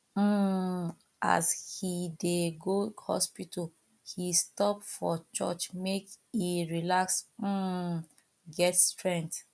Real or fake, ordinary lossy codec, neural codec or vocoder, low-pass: real; none; none; none